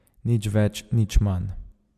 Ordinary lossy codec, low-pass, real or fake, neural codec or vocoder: MP3, 96 kbps; 14.4 kHz; real; none